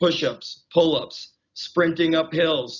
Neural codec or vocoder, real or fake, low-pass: none; real; 7.2 kHz